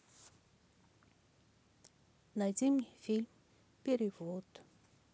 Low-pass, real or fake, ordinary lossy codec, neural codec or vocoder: none; real; none; none